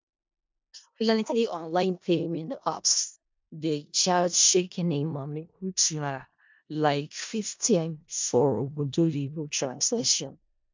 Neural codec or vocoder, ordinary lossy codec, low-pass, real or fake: codec, 16 kHz in and 24 kHz out, 0.4 kbps, LongCat-Audio-Codec, four codebook decoder; MP3, 64 kbps; 7.2 kHz; fake